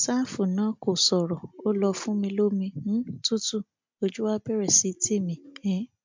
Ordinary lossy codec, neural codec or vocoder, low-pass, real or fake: MP3, 64 kbps; none; 7.2 kHz; real